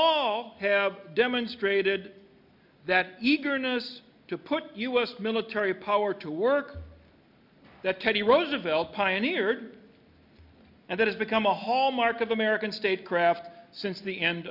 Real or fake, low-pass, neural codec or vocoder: real; 5.4 kHz; none